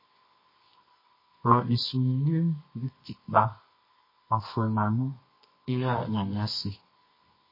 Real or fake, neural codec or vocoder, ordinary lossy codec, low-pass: fake; codec, 24 kHz, 0.9 kbps, WavTokenizer, medium music audio release; MP3, 24 kbps; 5.4 kHz